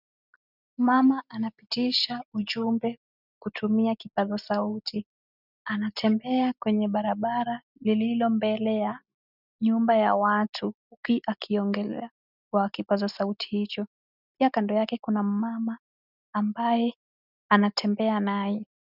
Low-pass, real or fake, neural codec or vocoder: 5.4 kHz; real; none